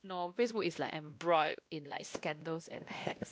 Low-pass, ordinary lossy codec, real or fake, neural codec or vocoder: none; none; fake; codec, 16 kHz, 1 kbps, X-Codec, WavLM features, trained on Multilingual LibriSpeech